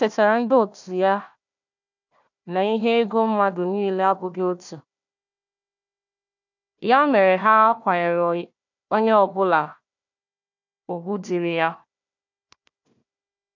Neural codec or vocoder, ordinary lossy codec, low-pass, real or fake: codec, 16 kHz, 1 kbps, FunCodec, trained on Chinese and English, 50 frames a second; none; 7.2 kHz; fake